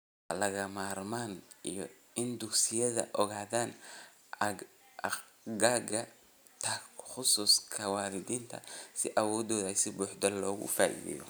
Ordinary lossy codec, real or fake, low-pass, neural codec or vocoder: none; real; none; none